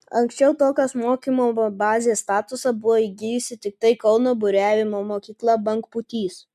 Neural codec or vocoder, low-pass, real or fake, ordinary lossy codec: none; 14.4 kHz; real; MP3, 96 kbps